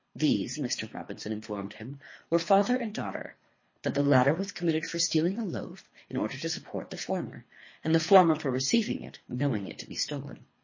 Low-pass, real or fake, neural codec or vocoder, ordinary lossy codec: 7.2 kHz; fake; codec, 24 kHz, 3 kbps, HILCodec; MP3, 32 kbps